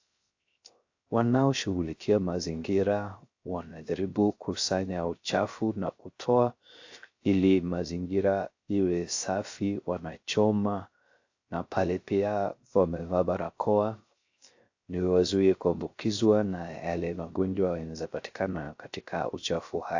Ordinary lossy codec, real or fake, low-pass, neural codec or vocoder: AAC, 48 kbps; fake; 7.2 kHz; codec, 16 kHz, 0.3 kbps, FocalCodec